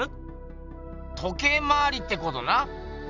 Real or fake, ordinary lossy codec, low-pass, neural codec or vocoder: real; none; 7.2 kHz; none